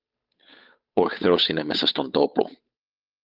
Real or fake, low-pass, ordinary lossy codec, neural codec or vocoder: fake; 5.4 kHz; Opus, 24 kbps; codec, 16 kHz, 8 kbps, FunCodec, trained on Chinese and English, 25 frames a second